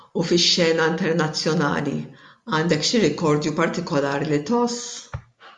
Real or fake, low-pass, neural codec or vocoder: real; 10.8 kHz; none